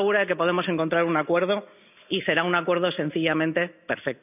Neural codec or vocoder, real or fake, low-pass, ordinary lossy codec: none; real; 3.6 kHz; none